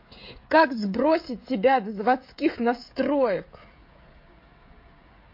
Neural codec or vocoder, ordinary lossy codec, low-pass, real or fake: codec, 16 kHz, 16 kbps, FreqCodec, smaller model; MP3, 32 kbps; 5.4 kHz; fake